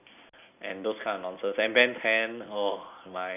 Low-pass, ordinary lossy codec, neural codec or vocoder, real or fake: 3.6 kHz; none; none; real